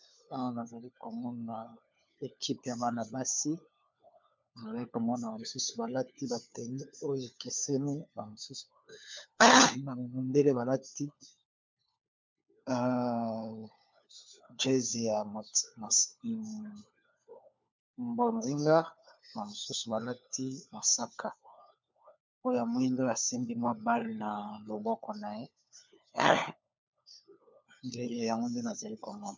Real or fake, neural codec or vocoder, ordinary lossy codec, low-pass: fake; codec, 16 kHz, 4 kbps, FunCodec, trained on LibriTTS, 50 frames a second; MP3, 64 kbps; 7.2 kHz